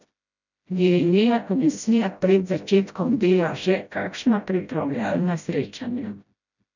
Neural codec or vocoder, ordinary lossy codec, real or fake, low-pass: codec, 16 kHz, 0.5 kbps, FreqCodec, smaller model; none; fake; 7.2 kHz